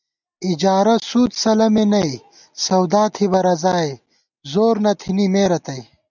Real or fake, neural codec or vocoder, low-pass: real; none; 7.2 kHz